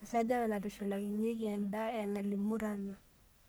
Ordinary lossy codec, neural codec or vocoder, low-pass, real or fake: none; codec, 44.1 kHz, 1.7 kbps, Pupu-Codec; none; fake